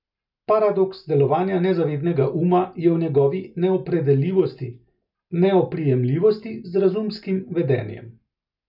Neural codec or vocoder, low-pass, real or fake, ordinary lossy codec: none; 5.4 kHz; real; none